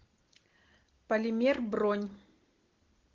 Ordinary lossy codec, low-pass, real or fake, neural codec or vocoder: Opus, 16 kbps; 7.2 kHz; real; none